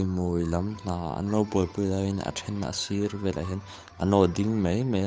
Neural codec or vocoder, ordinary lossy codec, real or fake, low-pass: codec, 16 kHz, 8 kbps, FunCodec, trained on Chinese and English, 25 frames a second; none; fake; none